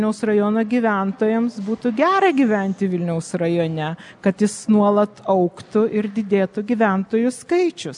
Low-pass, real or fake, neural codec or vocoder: 10.8 kHz; real; none